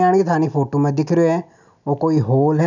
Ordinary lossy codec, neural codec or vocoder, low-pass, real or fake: none; none; 7.2 kHz; real